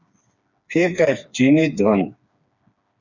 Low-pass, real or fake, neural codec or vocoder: 7.2 kHz; fake; codec, 16 kHz, 4 kbps, FreqCodec, smaller model